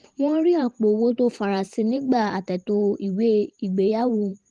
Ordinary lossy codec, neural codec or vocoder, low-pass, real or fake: Opus, 24 kbps; vocoder, 44.1 kHz, 128 mel bands every 512 samples, BigVGAN v2; 10.8 kHz; fake